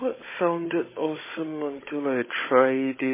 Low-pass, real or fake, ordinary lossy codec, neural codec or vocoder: 3.6 kHz; fake; MP3, 16 kbps; codec, 16 kHz, 16 kbps, FunCodec, trained on Chinese and English, 50 frames a second